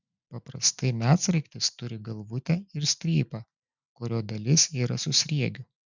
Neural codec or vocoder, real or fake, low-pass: none; real; 7.2 kHz